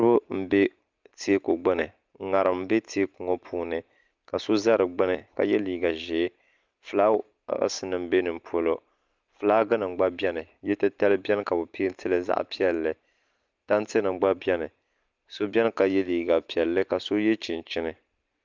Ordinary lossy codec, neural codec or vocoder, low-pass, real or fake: Opus, 24 kbps; none; 7.2 kHz; real